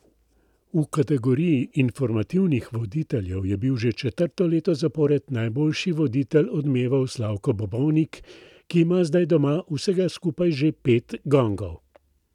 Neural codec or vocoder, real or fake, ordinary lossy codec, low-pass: none; real; none; 19.8 kHz